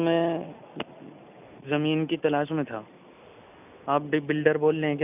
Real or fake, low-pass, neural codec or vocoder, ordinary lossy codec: real; 3.6 kHz; none; none